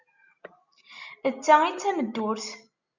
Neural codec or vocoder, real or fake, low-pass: none; real; 7.2 kHz